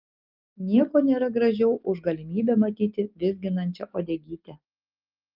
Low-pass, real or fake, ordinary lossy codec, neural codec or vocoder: 5.4 kHz; real; Opus, 32 kbps; none